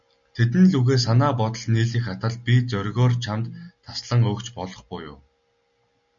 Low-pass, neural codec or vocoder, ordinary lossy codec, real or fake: 7.2 kHz; none; MP3, 96 kbps; real